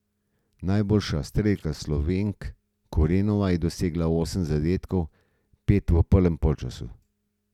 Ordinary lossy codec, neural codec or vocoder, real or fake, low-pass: none; none; real; 19.8 kHz